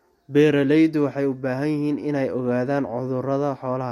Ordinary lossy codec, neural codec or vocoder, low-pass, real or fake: MP3, 64 kbps; none; 19.8 kHz; real